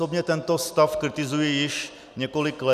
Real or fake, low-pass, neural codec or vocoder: real; 14.4 kHz; none